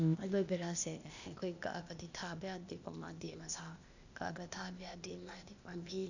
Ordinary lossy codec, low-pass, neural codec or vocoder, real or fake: none; 7.2 kHz; codec, 16 kHz, 0.8 kbps, ZipCodec; fake